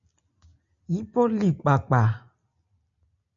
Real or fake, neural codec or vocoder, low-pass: real; none; 7.2 kHz